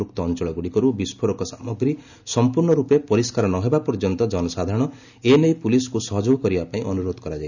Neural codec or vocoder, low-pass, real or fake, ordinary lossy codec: none; 7.2 kHz; real; none